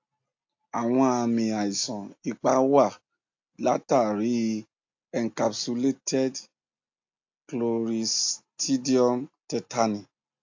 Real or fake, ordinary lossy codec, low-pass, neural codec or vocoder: real; AAC, 48 kbps; 7.2 kHz; none